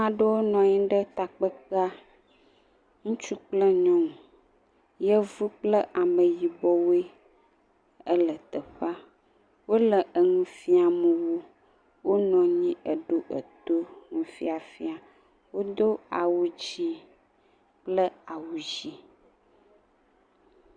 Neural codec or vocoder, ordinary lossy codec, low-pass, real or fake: none; Opus, 64 kbps; 9.9 kHz; real